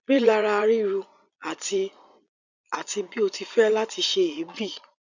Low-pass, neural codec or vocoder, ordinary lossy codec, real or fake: 7.2 kHz; vocoder, 22.05 kHz, 80 mel bands, Vocos; none; fake